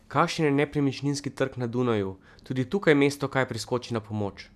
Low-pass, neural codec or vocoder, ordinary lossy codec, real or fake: 14.4 kHz; none; none; real